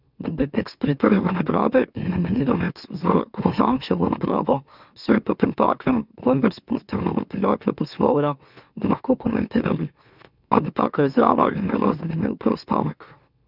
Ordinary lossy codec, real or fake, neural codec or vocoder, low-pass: none; fake; autoencoder, 44.1 kHz, a latent of 192 numbers a frame, MeloTTS; 5.4 kHz